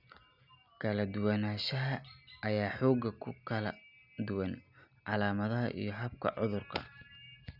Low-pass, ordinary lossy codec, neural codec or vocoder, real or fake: 5.4 kHz; none; none; real